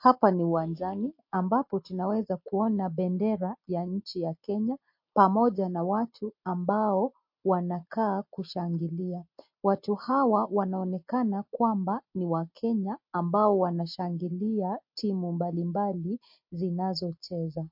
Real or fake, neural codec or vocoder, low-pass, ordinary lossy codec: real; none; 5.4 kHz; MP3, 32 kbps